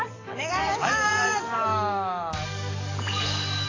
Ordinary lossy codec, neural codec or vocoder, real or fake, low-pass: AAC, 32 kbps; codec, 44.1 kHz, 7.8 kbps, DAC; fake; 7.2 kHz